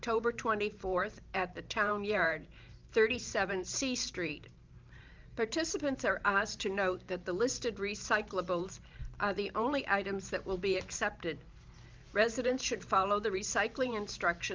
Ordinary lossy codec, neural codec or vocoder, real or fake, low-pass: Opus, 32 kbps; vocoder, 44.1 kHz, 128 mel bands every 512 samples, BigVGAN v2; fake; 7.2 kHz